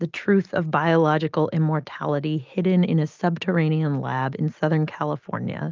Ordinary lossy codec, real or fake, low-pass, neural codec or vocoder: Opus, 32 kbps; real; 7.2 kHz; none